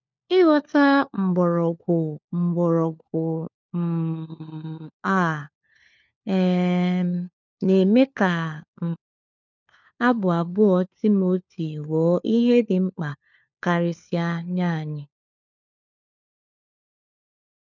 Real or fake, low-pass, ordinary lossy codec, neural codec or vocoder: fake; 7.2 kHz; none; codec, 16 kHz, 4 kbps, FunCodec, trained on LibriTTS, 50 frames a second